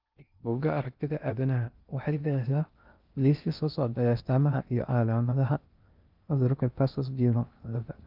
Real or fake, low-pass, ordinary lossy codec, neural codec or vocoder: fake; 5.4 kHz; Opus, 24 kbps; codec, 16 kHz in and 24 kHz out, 0.6 kbps, FocalCodec, streaming, 2048 codes